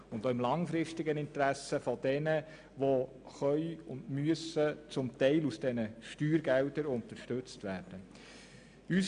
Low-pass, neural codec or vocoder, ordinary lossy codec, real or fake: 9.9 kHz; none; none; real